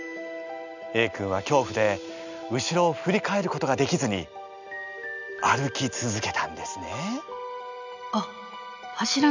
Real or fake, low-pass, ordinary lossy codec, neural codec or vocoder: real; 7.2 kHz; none; none